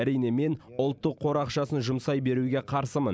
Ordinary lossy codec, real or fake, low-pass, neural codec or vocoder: none; real; none; none